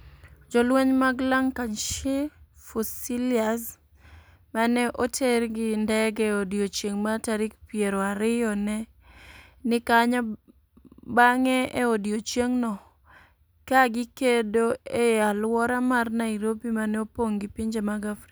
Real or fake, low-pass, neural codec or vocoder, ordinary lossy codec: real; none; none; none